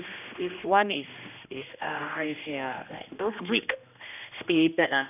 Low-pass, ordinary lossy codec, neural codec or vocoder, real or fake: 3.6 kHz; none; codec, 16 kHz, 1 kbps, X-Codec, HuBERT features, trained on general audio; fake